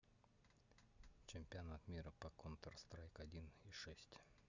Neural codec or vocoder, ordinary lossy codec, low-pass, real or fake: none; none; 7.2 kHz; real